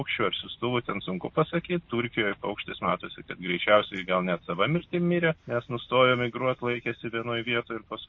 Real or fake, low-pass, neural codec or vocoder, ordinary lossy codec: real; 7.2 kHz; none; MP3, 32 kbps